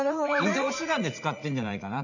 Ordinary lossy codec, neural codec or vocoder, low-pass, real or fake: none; vocoder, 44.1 kHz, 80 mel bands, Vocos; 7.2 kHz; fake